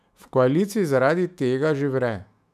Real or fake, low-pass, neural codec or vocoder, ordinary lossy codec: fake; 14.4 kHz; autoencoder, 48 kHz, 128 numbers a frame, DAC-VAE, trained on Japanese speech; none